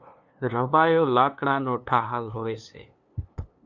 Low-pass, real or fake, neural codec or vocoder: 7.2 kHz; fake; codec, 16 kHz, 2 kbps, FunCodec, trained on LibriTTS, 25 frames a second